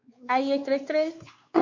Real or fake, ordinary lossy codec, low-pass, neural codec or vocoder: fake; MP3, 48 kbps; 7.2 kHz; codec, 16 kHz, 4 kbps, X-Codec, WavLM features, trained on Multilingual LibriSpeech